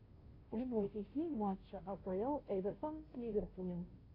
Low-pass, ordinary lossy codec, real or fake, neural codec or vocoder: 5.4 kHz; AAC, 24 kbps; fake; codec, 16 kHz, 0.5 kbps, FunCodec, trained on Chinese and English, 25 frames a second